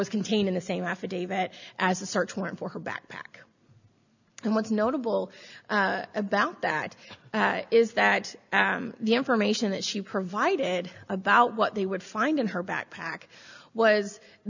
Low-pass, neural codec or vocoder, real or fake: 7.2 kHz; none; real